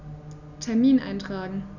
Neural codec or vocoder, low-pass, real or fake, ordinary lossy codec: none; 7.2 kHz; real; none